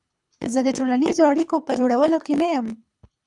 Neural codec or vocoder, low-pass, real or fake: codec, 24 kHz, 3 kbps, HILCodec; 10.8 kHz; fake